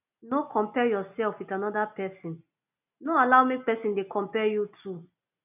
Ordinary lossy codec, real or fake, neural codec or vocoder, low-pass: none; real; none; 3.6 kHz